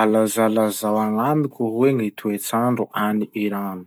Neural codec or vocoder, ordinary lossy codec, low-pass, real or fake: none; none; none; real